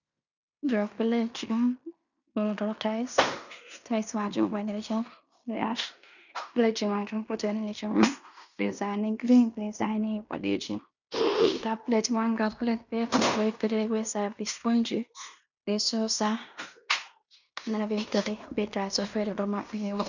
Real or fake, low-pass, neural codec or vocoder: fake; 7.2 kHz; codec, 16 kHz in and 24 kHz out, 0.9 kbps, LongCat-Audio-Codec, fine tuned four codebook decoder